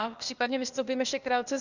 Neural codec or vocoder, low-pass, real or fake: codec, 16 kHz, 0.8 kbps, ZipCodec; 7.2 kHz; fake